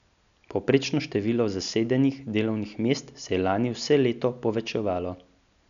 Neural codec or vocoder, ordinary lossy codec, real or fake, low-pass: none; none; real; 7.2 kHz